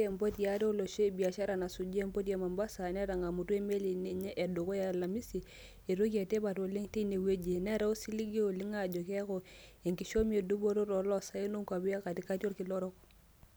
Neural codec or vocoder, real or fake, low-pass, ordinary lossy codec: none; real; none; none